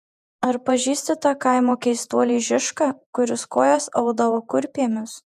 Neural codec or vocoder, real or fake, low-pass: vocoder, 48 kHz, 128 mel bands, Vocos; fake; 14.4 kHz